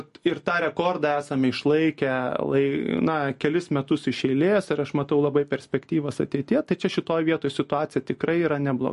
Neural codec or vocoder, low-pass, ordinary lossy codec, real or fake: none; 14.4 kHz; MP3, 48 kbps; real